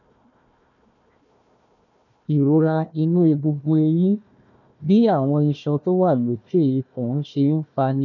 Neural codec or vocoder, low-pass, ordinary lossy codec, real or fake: codec, 16 kHz, 1 kbps, FunCodec, trained on Chinese and English, 50 frames a second; 7.2 kHz; none; fake